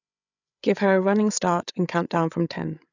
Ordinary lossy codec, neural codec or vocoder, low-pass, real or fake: none; codec, 16 kHz, 8 kbps, FreqCodec, larger model; 7.2 kHz; fake